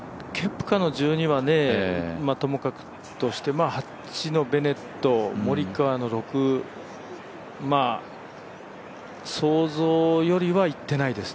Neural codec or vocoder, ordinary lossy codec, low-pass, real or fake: none; none; none; real